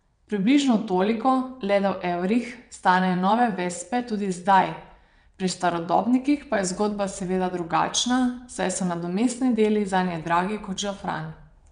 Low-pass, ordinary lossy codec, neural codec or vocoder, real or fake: 9.9 kHz; none; vocoder, 22.05 kHz, 80 mel bands, WaveNeXt; fake